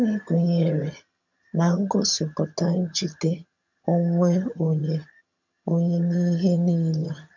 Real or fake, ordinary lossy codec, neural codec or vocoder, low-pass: fake; none; vocoder, 22.05 kHz, 80 mel bands, HiFi-GAN; 7.2 kHz